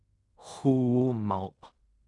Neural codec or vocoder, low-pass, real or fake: codec, 16 kHz in and 24 kHz out, 0.4 kbps, LongCat-Audio-Codec, fine tuned four codebook decoder; 10.8 kHz; fake